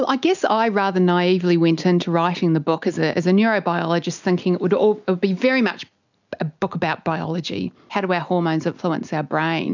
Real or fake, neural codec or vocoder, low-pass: real; none; 7.2 kHz